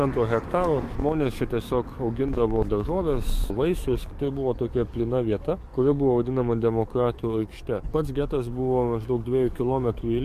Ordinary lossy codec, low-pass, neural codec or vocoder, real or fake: MP3, 64 kbps; 14.4 kHz; codec, 44.1 kHz, 7.8 kbps, DAC; fake